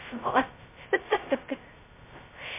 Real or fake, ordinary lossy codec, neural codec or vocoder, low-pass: fake; MP3, 24 kbps; codec, 16 kHz, 0.2 kbps, FocalCodec; 3.6 kHz